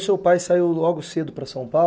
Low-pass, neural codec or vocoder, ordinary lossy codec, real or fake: none; none; none; real